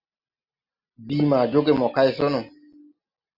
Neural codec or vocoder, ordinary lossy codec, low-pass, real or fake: none; Opus, 64 kbps; 5.4 kHz; real